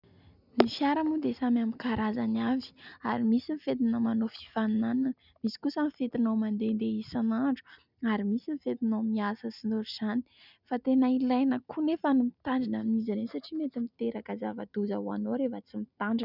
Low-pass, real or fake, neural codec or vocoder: 5.4 kHz; real; none